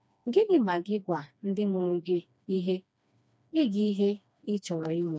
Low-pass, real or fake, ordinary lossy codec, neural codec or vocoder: none; fake; none; codec, 16 kHz, 2 kbps, FreqCodec, smaller model